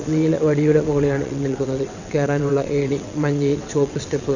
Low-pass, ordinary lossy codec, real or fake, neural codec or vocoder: 7.2 kHz; none; fake; vocoder, 22.05 kHz, 80 mel bands, WaveNeXt